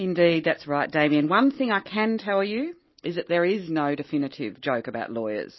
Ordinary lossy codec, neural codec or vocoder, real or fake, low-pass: MP3, 24 kbps; none; real; 7.2 kHz